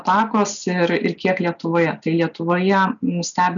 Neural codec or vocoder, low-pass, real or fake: none; 7.2 kHz; real